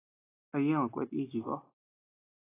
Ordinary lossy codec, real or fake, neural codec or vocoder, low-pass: AAC, 16 kbps; real; none; 3.6 kHz